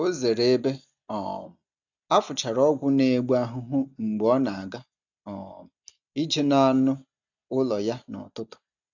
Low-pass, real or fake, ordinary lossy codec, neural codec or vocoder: 7.2 kHz; real; none; none